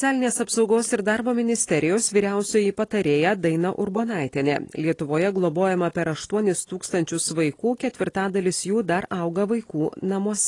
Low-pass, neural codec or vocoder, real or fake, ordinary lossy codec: 10.8 kHz; none; real; AAC, 32 kbps